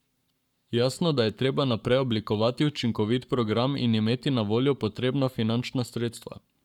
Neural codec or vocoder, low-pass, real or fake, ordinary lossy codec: codec, 44.1 kHz, 7.8 kbps, Pupu-Codec; 19.8 kHz; fake; none